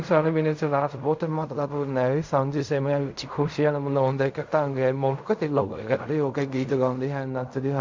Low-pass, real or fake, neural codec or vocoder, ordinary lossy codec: 7.2 kHz; fake; codec, 16 kHz in and 24 kHz out, 0.4 kbps, LongCat-Audio-Codec, fine tuned four codebook decoder; MP3, 64 kbps